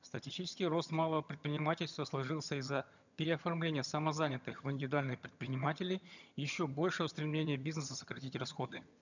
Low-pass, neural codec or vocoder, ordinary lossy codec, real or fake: 7.2 kHz; vocoder, 22.05 kHz, 80 mel bands, HiFi-GAN; none; fake